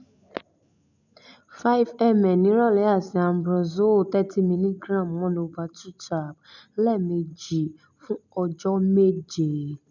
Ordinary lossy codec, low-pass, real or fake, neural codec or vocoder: none; 7.2 kHz; real; none